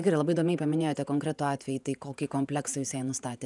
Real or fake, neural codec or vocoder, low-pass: fake; vocoder, 48 kHz, 128 mel bands, Vocos; 10.8 kHz